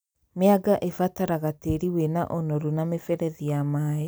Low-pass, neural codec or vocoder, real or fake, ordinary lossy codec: none; none; real; none